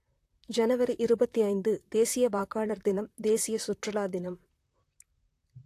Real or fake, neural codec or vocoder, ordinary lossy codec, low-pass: fake; vocoder, 44.1 kHz, 128 mel bands, Pupu-Vocoder; AAC, 64 kbps; 14.4 kHz